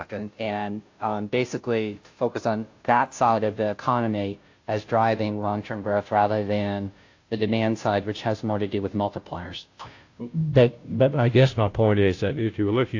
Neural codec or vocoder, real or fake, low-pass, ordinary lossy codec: codec, 16 kHz, 0.5 kbps, FunCodec, trained on Chinese and English, 25 frames a second; fake; 7.2 kHz; AAC, 48 kbps